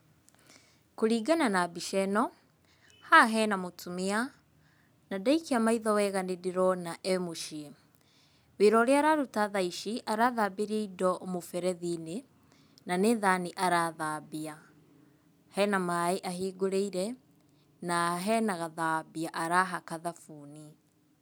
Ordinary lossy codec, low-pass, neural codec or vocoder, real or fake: none; none; none; real